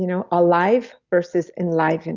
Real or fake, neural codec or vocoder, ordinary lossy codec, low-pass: real; none; Opus, 64 kbps; 7.2 kHz